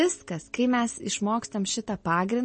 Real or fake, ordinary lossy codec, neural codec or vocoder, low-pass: real; MP3, 32 kbps; none; 10.8 kHz